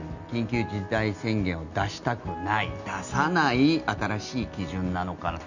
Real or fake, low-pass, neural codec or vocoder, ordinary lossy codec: real; 7.2 kHz; none; none